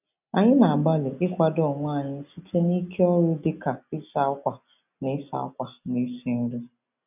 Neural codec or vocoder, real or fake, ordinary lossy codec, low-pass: none; real; none; 3.6 kHz